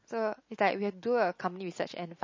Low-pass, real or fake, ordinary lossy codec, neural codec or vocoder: 7.2 kHz; real; MP3, 32 kbps; none